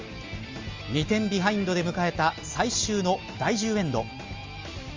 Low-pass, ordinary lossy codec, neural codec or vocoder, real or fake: 7.2 kHz; Opus, 32 kbps; none; real